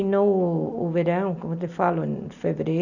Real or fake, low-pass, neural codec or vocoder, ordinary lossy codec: real; 7.2 kHz; none; none